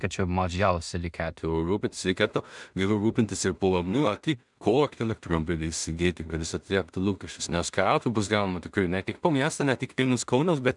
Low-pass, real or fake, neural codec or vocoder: 10.8 kHz; fake; codec, 16 kHz in and 24 kHz out, 0.4 kbps, LongCat-Audio-Codec, two codebook decoder